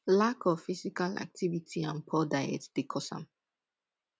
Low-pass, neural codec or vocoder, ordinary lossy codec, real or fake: none; none; none; real